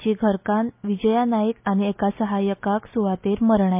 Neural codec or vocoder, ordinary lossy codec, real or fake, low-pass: none; MP3, 32 kbps; real; 3.6 kHz